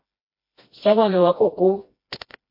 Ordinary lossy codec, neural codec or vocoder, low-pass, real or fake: MP3, 32 kbps; codec, 16 kHz, 1 kbps, FreqCodec, smaller model; 5.4 kHz; fake